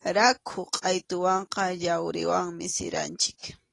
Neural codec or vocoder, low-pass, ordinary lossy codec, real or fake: none; 10.8 kHz; AAC, 32 kbps; real